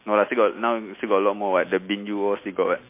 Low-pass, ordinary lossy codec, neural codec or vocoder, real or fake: 3.6 kHz; MP3, 24 kbps; none; real